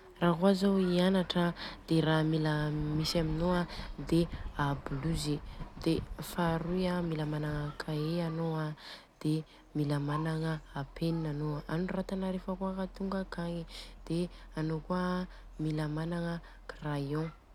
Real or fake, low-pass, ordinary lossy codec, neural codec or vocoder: real; 19.8 kHz; none; none